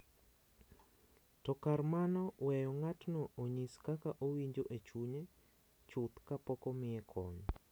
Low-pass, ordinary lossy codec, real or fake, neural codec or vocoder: none; none; real; none